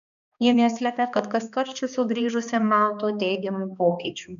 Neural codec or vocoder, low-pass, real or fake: codec, 16 kHz, 2 kbps, X-Codec, HuBERT features, trained on balanced general audio; 7.2 kHz; fake